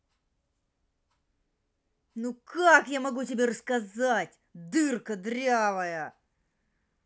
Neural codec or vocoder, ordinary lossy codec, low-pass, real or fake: none; none; none; real